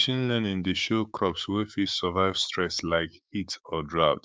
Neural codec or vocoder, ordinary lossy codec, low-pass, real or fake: codec, 16 kHz, 6 kbps, DAC; none; none; fake